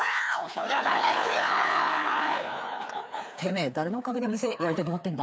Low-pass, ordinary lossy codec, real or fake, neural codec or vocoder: none; none; fake; codec, 16 kHz, 2 kbps, FreqCodec, larger model